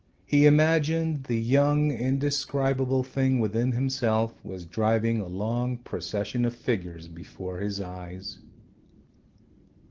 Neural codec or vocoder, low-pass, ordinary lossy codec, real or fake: none; 7.2 kHz; Opus, 16 kbps; real